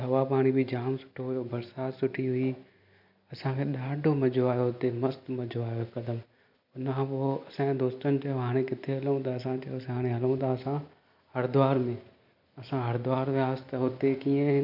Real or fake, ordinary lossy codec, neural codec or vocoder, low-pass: real; none; none; 5.4 kHz